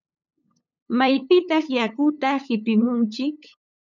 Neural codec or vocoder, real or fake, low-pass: codec, 16 kHz, 8 kbps, FunCodec, trained on LibriTTS, 25 frames a second; fake; 7.2 kHz